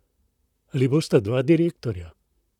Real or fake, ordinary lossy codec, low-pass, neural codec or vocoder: fake; none; 19.8 kHz; vocoder, 44.1 kHz, 128 mel bands, Pupu-Vocoder